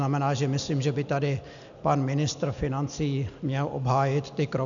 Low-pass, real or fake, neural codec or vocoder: 7.2 kHz; real; none